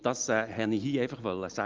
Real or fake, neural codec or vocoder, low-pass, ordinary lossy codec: real; none; 7.2 kHz; Opus, 32 kbps